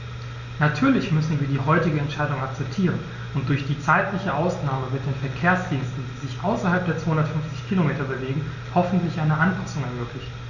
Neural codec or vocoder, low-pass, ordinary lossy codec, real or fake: none; 7.2 kHz; none; real